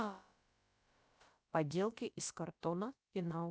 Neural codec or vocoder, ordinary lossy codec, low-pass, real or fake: codec, 16 kHz, about 1 kbps, DyCAST, with the encoder's durations; none; none; fake